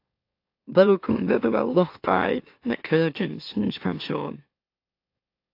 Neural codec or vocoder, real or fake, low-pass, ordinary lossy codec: autoencoder, 44.1 kHz, a latent of 192 numbers a frame, MeloTTS; fake; 5.4 kHz; AAC, 32 kbps